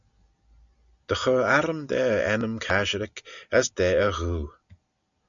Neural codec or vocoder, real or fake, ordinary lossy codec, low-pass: none; real; MP3, 96 kbps; 7.2 kHz